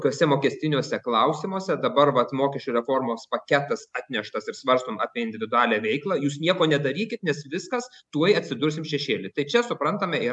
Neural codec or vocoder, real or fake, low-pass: none; real; 10.8 kHz